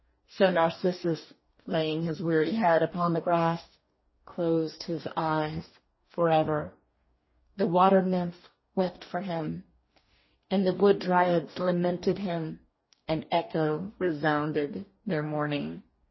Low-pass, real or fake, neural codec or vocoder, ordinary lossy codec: 7.2 kHz; fake; codec, 44.1 kHz, 2.6 kbps, DAC; MP3, 24 kbps